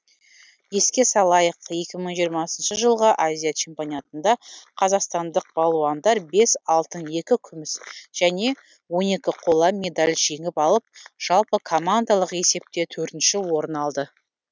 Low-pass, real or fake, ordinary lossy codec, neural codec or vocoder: none; real; none; none